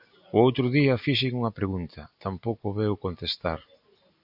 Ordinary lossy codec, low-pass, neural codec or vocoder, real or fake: AAC, 48 kbps; 5.4 kHz; none; real